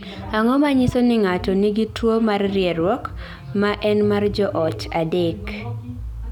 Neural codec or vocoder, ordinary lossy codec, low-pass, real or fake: none; none; 19.8 kHz; real